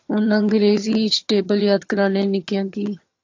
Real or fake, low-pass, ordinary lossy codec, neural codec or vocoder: fake; 7.2 kHz; AAC, 48 kbps; vocoder, 22.05 kHz, 80 mel bands, HiFi-GAN